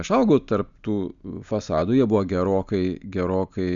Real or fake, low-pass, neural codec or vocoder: real; 7.2 kHz; none